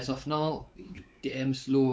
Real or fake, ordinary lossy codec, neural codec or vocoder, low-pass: fake; none; codec, 16 kHz, 4 kbps, X-Codec, WavLM features, trained on Multilingual LibriSpeech; none